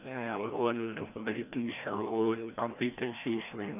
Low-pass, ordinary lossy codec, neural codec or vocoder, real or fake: 3.6 kHz; none; codec, 16 kHz, 1 kbps, FreqCodec, larger model; fake